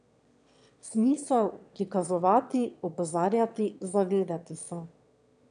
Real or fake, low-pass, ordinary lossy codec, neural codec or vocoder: fake; 9.9 kHz; none; autoencoder, 22.05 kHz, a latent of 192 numbers a frame, VITS, trained on one speaker